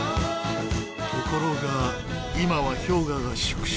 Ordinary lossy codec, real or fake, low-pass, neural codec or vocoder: none; real; none; none